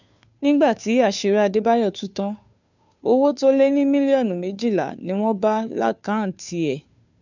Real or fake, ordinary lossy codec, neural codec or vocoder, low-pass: fake; none; codec, 16 kHz, 2 kbps, FunCodec, trained on Chinese and English, 25 frames a second; 7.2 kHz